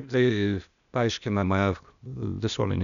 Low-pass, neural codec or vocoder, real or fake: 7.2 kHz; codec, 16 kHz, 0.8 kbps, ZipCodec; fake